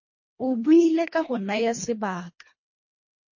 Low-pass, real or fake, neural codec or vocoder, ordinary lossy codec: 7.2 kHz; fake; codec, 24 kHz, 1.5 kbps, HILCodec; MP3, 32 kbps